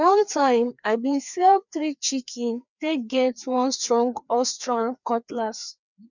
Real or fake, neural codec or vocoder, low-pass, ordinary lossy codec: fake; codec, 16 kHz, 2 kbps, FreqCodec, larger model; 7.2 kHz; none